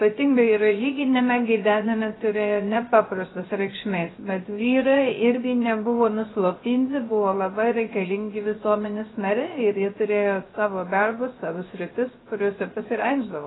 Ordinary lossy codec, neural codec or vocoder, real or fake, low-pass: AAC, 16 kbps; codec, 16 kHz, 0.3 kbps, FocalCodec; fake; 7.2 kHz